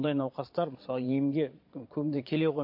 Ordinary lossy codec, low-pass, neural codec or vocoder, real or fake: MP3, 32 kbps; 5.4 kHz; vocoder, 22.05 kHz, 80 mel bands, Vocos; fake